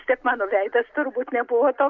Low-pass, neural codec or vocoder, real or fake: 7.2 kHz; none; real